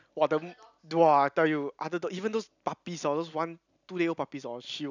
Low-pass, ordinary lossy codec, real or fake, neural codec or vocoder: 7.2 kHz; none; real; none